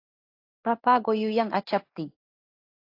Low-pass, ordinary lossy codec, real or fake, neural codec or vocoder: 5.4 kHz; AAC, 32 kbps; fake; codec, 16 kHz in and 24 kHz out, 1 kbps, XY-Tokenizer